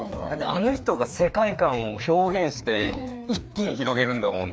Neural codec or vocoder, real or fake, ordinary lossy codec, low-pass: codec, 16 kHz, 2 kbps, FreqCodec, larger model; fake; none; none